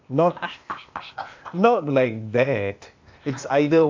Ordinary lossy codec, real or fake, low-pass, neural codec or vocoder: MP3, 64 kbps; fake; 7.2 kHz; codec, 16 kHz, 0.8 kbps, ZipCodec